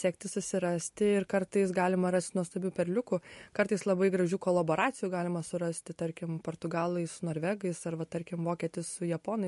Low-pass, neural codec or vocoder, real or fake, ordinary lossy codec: 14.4 kHz; none; real; MP3, 48 kbps